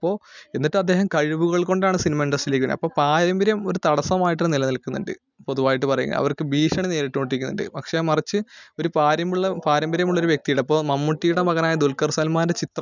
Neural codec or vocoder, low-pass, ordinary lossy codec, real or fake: none; 7.2 kHz; none; real